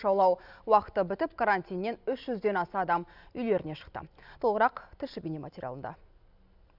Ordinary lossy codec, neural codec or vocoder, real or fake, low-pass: none; none; real; 5.4 kHz